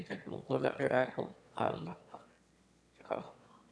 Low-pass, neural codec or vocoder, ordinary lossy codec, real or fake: none; autoencoder, 22.05 kHz, a latent of 192 numbers a frame, VITS, trained on one speaker; none; fake